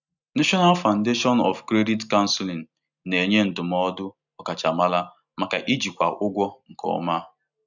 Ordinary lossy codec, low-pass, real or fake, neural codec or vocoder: none; 7.2 kHz; real; none